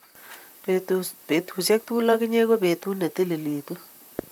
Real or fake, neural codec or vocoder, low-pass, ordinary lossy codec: fake; vocoder, 44.1 kHz, 128 mel bands, Pupu-Vocoder; none; none